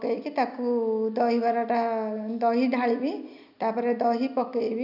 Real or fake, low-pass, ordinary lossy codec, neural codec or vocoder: real; 5.4 kHz; none; none